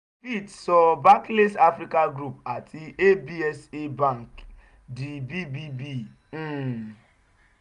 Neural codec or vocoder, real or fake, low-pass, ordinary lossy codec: none; real; 10.8 kHz; none